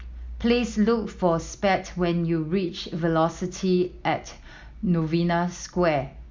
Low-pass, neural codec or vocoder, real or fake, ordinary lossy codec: 7.2 kHz; none; real; MP3, 64 kbps